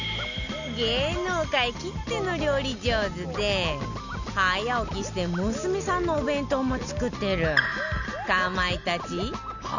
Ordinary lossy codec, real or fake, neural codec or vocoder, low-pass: none; real; none; 7.2 kHz